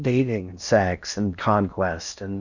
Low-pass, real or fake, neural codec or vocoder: 7.2 kHz; fake; codec, 16 kHz in and 24 kHz out, 0.6 kbps, FocalCodec, streaming, 4096 codes